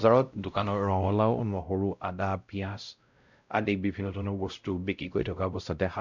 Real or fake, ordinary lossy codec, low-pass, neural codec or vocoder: fake; none; 7.2 kHz; codec, 16 kHz, 0.5 kbps, X-Codec, WavLM features, trained on Multilingual LibriSpeech